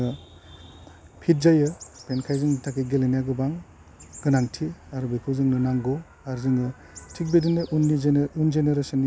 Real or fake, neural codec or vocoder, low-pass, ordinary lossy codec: real; none; none; none